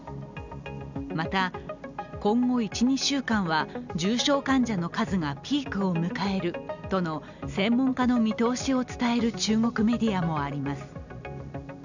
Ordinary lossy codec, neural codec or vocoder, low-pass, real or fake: none; none; 7.2 kHz; real